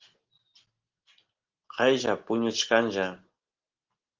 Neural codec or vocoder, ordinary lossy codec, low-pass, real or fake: none; Opus, 16 kbps; 7.2 kHz; real